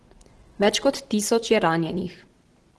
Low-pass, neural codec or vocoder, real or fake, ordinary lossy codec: 10.8 kHz; vocoder, 44.1 kHz, 128 mel bands every 512 samples, BigVGAN v2; fake; Opus, 16 kbps